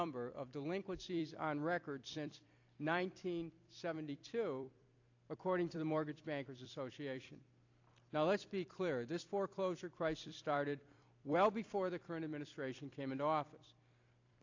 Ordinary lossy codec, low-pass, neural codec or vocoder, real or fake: AAC, 48 kbps; 7.2 kHz; vocoder, 44.1 kHz, 128 mel bands every 256 samples, BigVGAN v2; fake